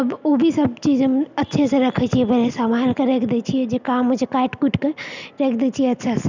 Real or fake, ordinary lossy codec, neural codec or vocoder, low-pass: real; none; none; 7.2 kHz